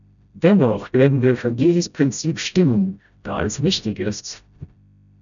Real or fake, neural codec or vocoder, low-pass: fake; codec, 16 kHz, 0.5 kbps, FreqCodec, smaller model; 7.2 kHz